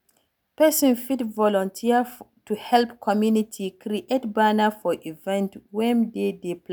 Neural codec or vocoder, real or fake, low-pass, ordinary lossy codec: none; real; none; none